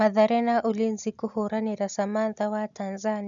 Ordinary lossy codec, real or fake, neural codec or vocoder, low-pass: none; real; none; 7.2 kHz